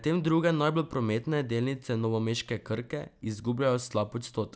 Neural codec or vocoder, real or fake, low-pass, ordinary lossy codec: none; real; none; none